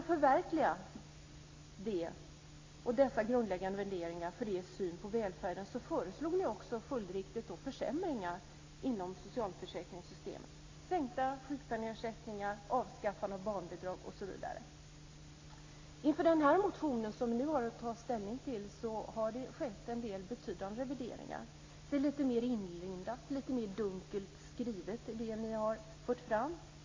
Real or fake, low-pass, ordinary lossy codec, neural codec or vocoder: real; 7.2 kHz; AAC, 32 kbps; none